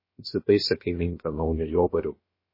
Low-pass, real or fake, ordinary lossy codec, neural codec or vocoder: 5.4 kHz; fake; MP3, 24 kbps; codec, 16 kHz, about 1 kbps, DyCAST, with the encoder's durations